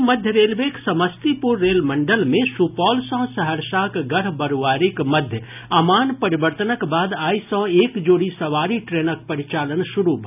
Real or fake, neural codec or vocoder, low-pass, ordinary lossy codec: real; none; 3.6 kHz; none